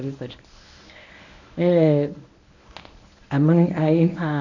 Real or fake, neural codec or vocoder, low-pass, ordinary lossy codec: fake; codec, 24 kHz, 0.9 kbps, WavTokenizer, medium speech release version 1; 7.2 kHz; none